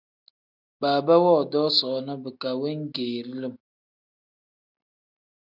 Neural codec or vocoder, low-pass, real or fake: none; 5.4 kHz; real